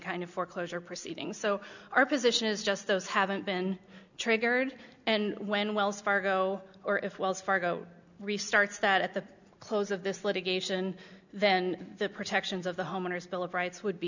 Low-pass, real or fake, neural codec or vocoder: 7.2 kHz; real; none